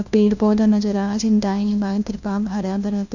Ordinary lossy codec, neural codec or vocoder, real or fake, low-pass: none; codec, 16 kHz, 1 kbps, FunCodec, trained on LibriTTS, 50 frames a second; fake; 7.2 kHz